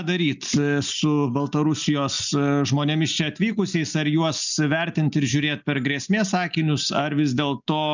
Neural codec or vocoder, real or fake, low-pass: none; real; 7.2 kHz